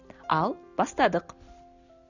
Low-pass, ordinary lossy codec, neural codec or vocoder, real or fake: 7.2 kHz; none; none; real